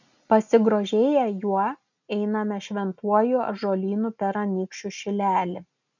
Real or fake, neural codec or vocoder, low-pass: real; none; 7.2 kHz